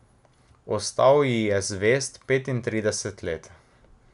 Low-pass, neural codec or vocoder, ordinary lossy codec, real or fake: 10.8 kHz; none; none; real